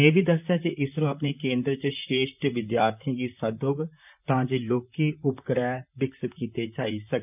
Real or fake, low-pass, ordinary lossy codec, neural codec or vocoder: fake; 3.6 kHz; none; vocoder, 44.1 kHz, 128 mel bands, Pupu-Vocoder